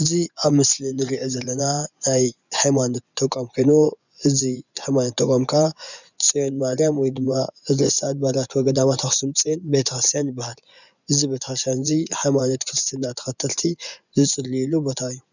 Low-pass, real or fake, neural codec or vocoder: 7.2 kHz; fake; vocoder, 24 kHz, 100 mel bands, Vocos